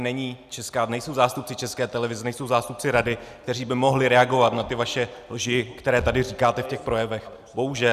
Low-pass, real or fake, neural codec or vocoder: 14.4 kHz; real; none